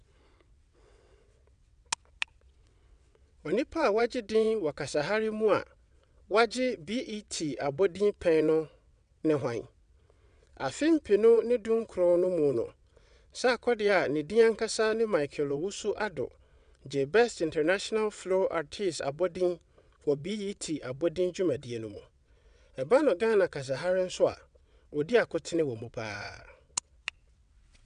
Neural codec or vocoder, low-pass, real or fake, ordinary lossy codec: vocoder, 22.05 kHz, 80 mel bands, WaveNeXt; 9.9 kHz; fake; none